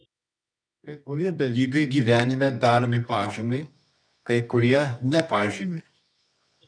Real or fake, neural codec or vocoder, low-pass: fake; codec, 24 kHz, 0.9 kbps, WavTokenizer, medium music audio release; 9.9 kHz